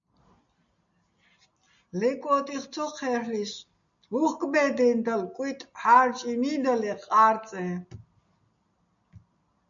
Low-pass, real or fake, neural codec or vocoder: 7.2 kHz; real; none